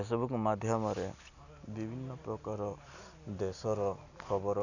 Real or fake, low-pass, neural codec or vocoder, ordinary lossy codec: real; 7.2 kHz; none; none